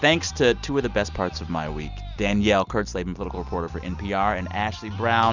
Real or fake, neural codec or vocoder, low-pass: real; none; 7.2 kHz